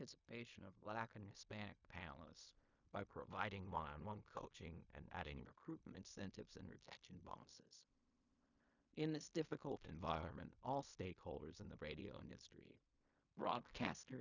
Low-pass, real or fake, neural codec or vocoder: 7.2 kHz; fake; codec, 16 kHz in and 24 kHz out, 0.4 kbps, LongCat-Audio-Codec, fine tuned four codebook decoder